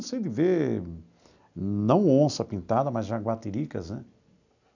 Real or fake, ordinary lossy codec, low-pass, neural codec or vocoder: real; none; 7.2 kHz; none